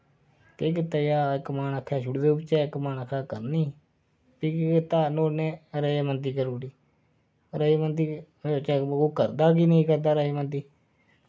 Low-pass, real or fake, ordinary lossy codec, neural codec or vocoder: none; real; none; none